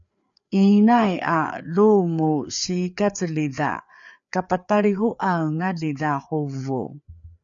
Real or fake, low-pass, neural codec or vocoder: fake; 7.2 kHz; codec, 16 kHz, 4 kbps, FreqCodec, larger model